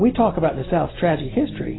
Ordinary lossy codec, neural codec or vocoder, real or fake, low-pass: AAC, 16 kbps; none; real; 7.2 kHz